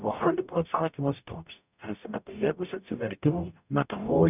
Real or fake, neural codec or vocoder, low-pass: fake; codec, 44.1 kHz, 0.9 kbps, DAC; 3.6 kHz